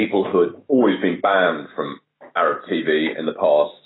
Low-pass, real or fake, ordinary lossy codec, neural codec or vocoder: 7.2 kHz; fake; AAC, 16 kbps; autoencoder, 48 kHz, 128 numbers a frame, DAC-VAE, trained on Japanese speech